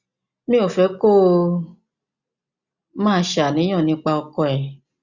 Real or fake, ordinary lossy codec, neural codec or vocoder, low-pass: real; Opus, 64 kbps; none; 7.2 kHz